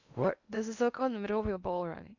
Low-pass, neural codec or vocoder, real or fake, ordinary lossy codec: 7.2 kHz; codec, 16 kHz in and 24 kHz out, 0.8 kbps, FocalCodec, streaming, 65536 codes; fake; none